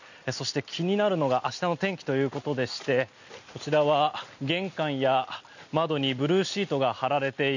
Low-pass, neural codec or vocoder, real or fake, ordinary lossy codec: 7.2 kHz; none; real; none